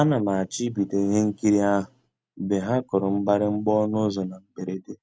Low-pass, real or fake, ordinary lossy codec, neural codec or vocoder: none; real; none; none